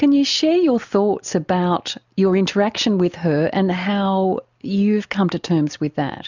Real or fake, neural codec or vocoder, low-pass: real; none; 7.2 kHz